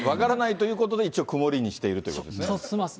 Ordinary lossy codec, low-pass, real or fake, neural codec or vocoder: none; none; real; none